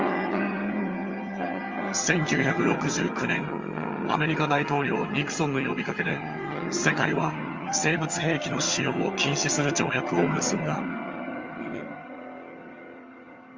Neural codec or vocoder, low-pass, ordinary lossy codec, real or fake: vocoder, 22.05 kHz, 80 mel bands, HiFi-GAN; 7.2 kHz; Opus, 32 kbps; fake